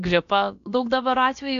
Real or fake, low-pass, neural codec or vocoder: fake; 7.2 kHz; codec, 16 kHz, about 1 kbps, DyCAST, with the encoder's durations